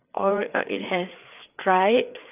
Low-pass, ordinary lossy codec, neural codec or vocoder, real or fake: 3.6 kHz; none; codec, 16 kHz, 4 kbps, FreqCodec, larger model; fake